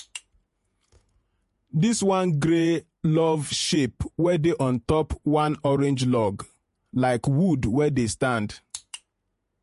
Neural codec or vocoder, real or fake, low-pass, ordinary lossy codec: vocoder, 48 kHz, 128 mel bands, Vocos; fake; 14.4 kHz; MP3, 48 kbps